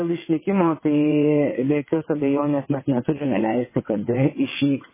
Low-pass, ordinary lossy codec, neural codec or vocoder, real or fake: 3.6 kHz; MP3, 16 kbps; vocoder, 22.05 kHz, 80 mel bands, WaveNeXt; fake